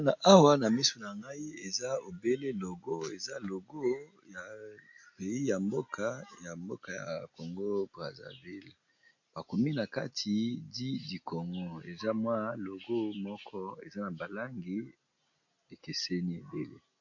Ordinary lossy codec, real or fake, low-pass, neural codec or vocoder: AAC, 48 kbps; real; 7.2 kHz; none